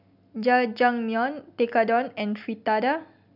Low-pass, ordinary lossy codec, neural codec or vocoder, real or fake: 5.4 kHz; none; none; real